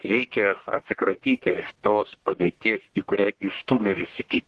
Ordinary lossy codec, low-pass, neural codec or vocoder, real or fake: Opus, 16 kbps; 10.8 kHz; codec, 44.1 kHz, 1.7 kbps, Pupu-Codec; fake